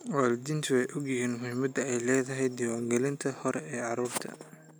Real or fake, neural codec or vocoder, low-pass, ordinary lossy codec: real; none; none; none